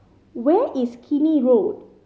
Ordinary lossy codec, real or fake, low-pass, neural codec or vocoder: none; real; none; none